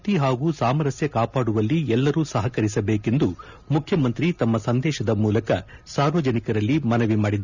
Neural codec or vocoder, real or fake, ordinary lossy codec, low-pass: none; real; none; 7.2 kHz